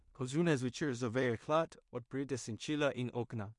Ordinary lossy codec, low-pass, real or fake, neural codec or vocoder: MP3, 64 kbps; 10.8 kHz; fake; codec, 16 kHz in and 24 kHz out, 0.4 kbps, LongCat-Audio-Codec, two codebook decoder